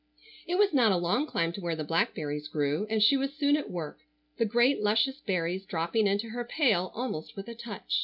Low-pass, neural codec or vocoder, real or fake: 5.4 kHz; none; real